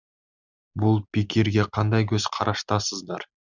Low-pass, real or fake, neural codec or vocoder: 7.2 kHz; real; none